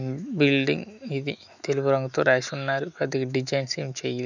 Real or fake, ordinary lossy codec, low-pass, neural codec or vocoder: fake; none; 7.2 kHz; autoencoder, 48 kHz, 128 numbers a frame, DAC-VAE, trained on Japanese speech